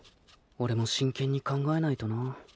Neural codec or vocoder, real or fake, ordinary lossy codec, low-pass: none; real; none; none